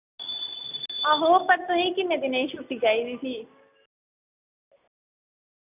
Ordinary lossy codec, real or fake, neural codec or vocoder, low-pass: none; real; none; 3.6 kHz